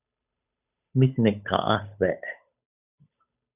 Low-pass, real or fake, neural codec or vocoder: 3.6 kHz; fake; codec, 16 kHz, 8 kbps, FunCodec, trained on Chinese and English, 25 frames a second